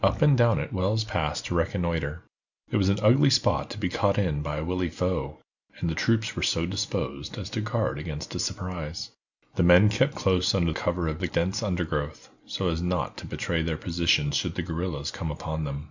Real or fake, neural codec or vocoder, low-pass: real; none; 7.2 kHz